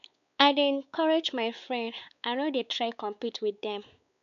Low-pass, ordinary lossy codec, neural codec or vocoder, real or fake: 7.2 kHz; none; codec, 16 kHz, 4 kbps, X-Codec, WavLM features, trained on Multilingual LibriSpeech; fake